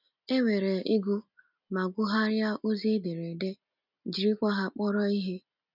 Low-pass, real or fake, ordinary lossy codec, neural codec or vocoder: 5.4 kHz; real; none; none